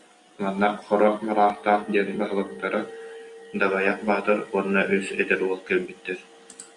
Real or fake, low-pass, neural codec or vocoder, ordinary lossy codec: real; 10.8 kHz; none; AAC, 64 kbps